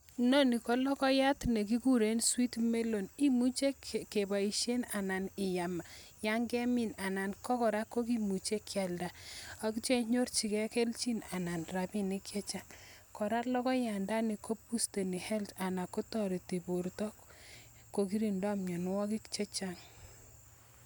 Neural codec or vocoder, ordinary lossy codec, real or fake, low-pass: none; none; real; none